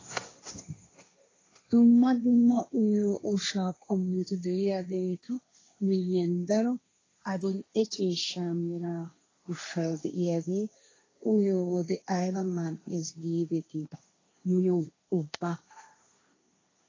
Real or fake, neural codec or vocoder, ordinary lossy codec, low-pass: fake; codec, 16 kHz, 1.1 kbps, Voila-Tokenizer; AAC, 32 kbps; 7.2 kHz